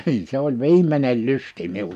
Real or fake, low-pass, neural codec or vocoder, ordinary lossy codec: real; 14.4 kHz; none; none